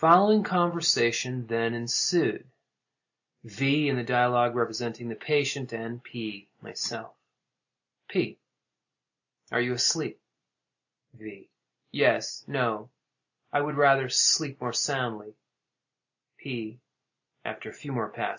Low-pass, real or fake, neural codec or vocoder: 7.2 kHz; real; none